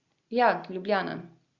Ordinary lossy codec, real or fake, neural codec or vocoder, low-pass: Opus, 64 kbps; real; none; 7.2 kHz